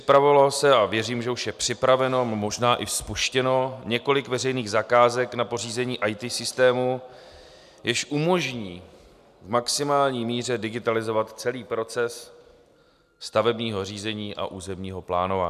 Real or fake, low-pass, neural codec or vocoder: real; 14.4 kHz; none